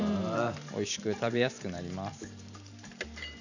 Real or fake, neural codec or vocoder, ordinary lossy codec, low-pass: fake; vocoder, 44.1 kHz, 128 mel bands every 512 samples, BigVGAN v2; none; 7.2 kHz